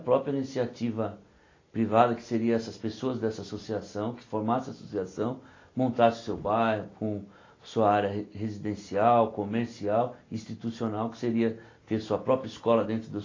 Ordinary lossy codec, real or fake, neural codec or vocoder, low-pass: AAC, 32 kbps; real; none; 7.2 kHz